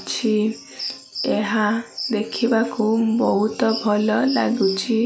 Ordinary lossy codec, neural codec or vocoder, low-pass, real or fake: none; none; none; real